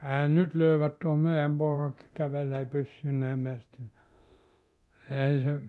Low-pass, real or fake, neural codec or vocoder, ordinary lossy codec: none; fake; codec, 24 kHz, 0.9 kbps, DualCodec; none